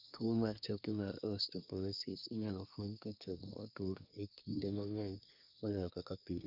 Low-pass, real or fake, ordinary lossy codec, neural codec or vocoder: 5.4 kHz; fake; none; codec, 24 kHz, 1 kbps, SNAC